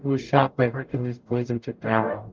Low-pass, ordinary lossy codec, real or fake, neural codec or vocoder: 7.2 kHz; Opus, 24 kbps; fake; codec, 44.1 kHz, 0.9 kbps, DAC